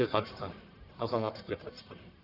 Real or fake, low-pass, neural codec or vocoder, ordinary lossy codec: fake; 5.4 kHz; codec, 44.1 kHz, 1.7 kbps, Pupu-Codec; none